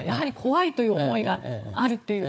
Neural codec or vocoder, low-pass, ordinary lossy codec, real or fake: codec, 16 kHz, 4 kbps, FunCodec, trained on Chinese and English, 50 frames a second; none; none; fake